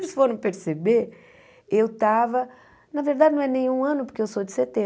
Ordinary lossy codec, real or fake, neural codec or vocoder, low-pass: none; real; none; none